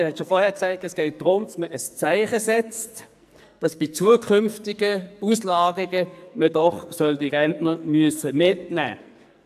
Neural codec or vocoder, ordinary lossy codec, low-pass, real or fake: codec, 44.1 kHz, 2.6 kbps, SNAC; AAC, 96 kbps; 14.4 kHz; fake